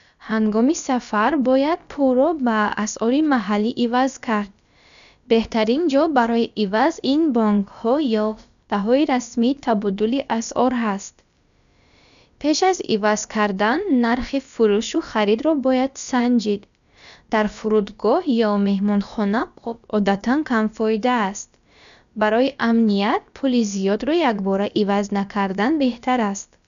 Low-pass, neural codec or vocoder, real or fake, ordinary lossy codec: 7.2 kHz; codec, 16 kHz, about 1 kbps, DyCAST, with the encoder's durations; fake; none